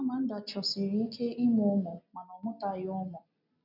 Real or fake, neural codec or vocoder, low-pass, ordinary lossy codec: real; none; 5.4 kHz; none